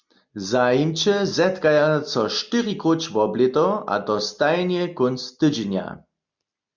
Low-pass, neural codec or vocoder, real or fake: 7.2 kHz; none; real